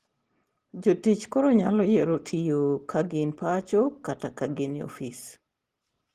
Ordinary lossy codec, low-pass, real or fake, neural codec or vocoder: Opus, 16 kbps; 14.4 kHz; real; none